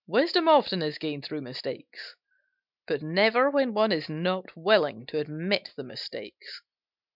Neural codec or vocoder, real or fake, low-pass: none; real; 5.4 kHz